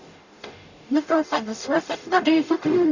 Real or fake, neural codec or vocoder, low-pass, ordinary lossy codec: fake; codec, 44.1 kHz, 0.9 kbps, DAC; 7.2 kHz; none